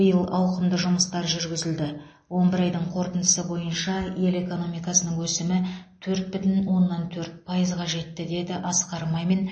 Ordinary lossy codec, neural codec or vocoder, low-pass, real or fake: MP3, 32 kbps; none; 9.9 kHz; real